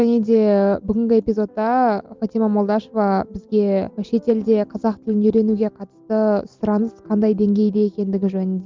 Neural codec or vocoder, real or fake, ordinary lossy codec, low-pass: none; real; Opus, 32 kbps; 7.2 kHz